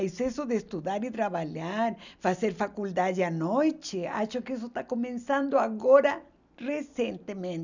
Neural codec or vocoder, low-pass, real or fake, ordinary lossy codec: none; 7.2 kHz; real; none